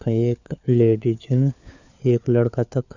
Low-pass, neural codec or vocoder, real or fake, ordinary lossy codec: 7.2 kHz; codec, 16 kHz, 4 kbps, FunCodec, trained on LibriTTS, 50 frames a second; fake; none